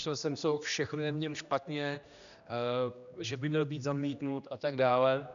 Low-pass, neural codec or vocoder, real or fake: 7.2 kHz; codec, 16 kHz, 1 kbps, X-Codec, HuBERT features, trained on general audio; fake